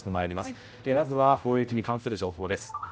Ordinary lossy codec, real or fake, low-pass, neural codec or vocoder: none; fake; none; codec, 16 kHz, 0.5 kbps, X-Codec, HuBERT features, trained on general audio